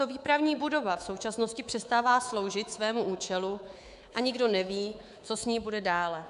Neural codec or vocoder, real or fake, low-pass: codec, 24 kHz, 3.1 kbps, DualCodec; fake; 10.8 kHz